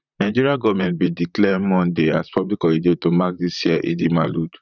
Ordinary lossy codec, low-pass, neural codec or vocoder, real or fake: none; 7.2 kHz; vocoder, 44.1 kHz, 128 mel bands, Pupu-Vocoder; fake